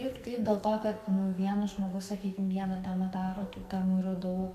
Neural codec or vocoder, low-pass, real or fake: codec, 44.1 kHz, 2.6 kbps, SNAC; 14.4 kHz; fake